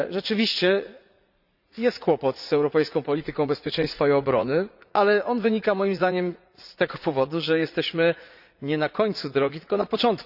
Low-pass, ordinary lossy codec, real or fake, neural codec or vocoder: 5.4 kHz; Opus, 64 kbps; fake; vocoder, 44.1 kHz, 80 mel bands, Vocos